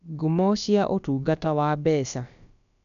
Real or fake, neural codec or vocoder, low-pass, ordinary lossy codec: fake; codec, 16 kHz, about 1 kbps, DyCAST, with the encoder's durations; 7.2 kHz; none